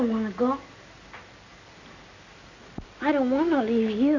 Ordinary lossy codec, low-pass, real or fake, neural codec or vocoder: AAC, 48 kbps; 7.2 kHz; real; none